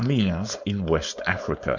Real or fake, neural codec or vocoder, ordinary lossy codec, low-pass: fake; codec, 16 kHz, 4.8 kbps, FACodec; MP3, 64 kbps; 7.2 kHz